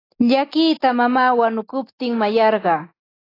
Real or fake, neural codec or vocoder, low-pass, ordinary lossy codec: real; none; 5.4 kHz; AAC, 24 kbps